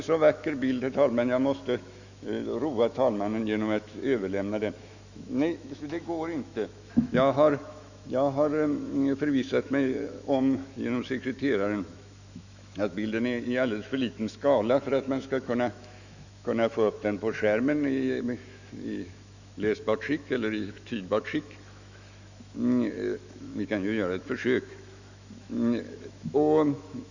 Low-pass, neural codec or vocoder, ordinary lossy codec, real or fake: 7.2 kHz; autoencoder, 48 kHz, 128 numbers a frame, DAC-VAE, trained on Japanese speech; none; fake